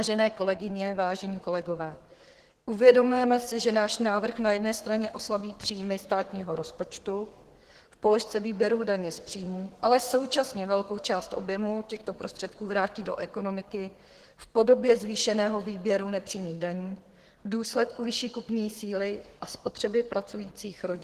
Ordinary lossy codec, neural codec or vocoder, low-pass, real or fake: Opus, 16 kbps; codec, 32 kHz, 1.9 kbps, SNAC; 14.4 kHz; fake